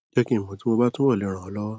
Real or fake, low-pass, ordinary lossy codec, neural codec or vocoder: real; none; none; none